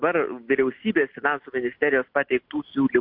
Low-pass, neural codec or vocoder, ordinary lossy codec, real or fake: 5.4 kHz; none; MP3, 48 kbps; real